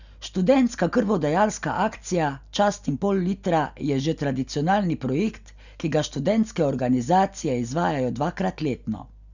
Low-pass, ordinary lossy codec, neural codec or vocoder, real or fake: 7.2 kHz; none; none; real